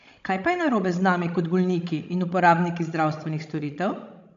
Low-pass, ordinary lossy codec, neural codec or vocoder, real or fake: 7.2 kHz; MP3, 64 kbps; codec, 16 kHz, 16 kbps, FreqCodec, larger model; fake